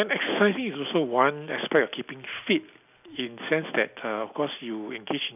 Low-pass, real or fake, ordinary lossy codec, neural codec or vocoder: 3.6 kHz; real; none; none